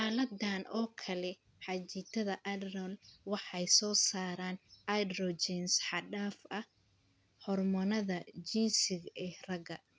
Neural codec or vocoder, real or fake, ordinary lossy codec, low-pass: none; real; none; none